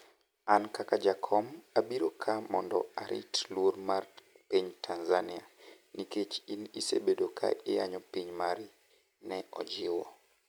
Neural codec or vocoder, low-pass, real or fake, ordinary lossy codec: none; none; real; none